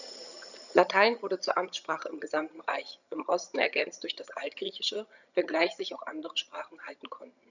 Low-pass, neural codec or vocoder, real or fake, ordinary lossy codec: 7.2 kHz; vocoder, 22.05 kHz, 80 mel bands, HiFi-GAN; fake; none